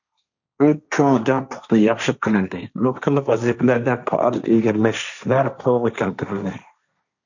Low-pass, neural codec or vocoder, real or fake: 7.2 kHz; codec, 16 kHz, 1.1 kbps, Voila-Tokenizer; fake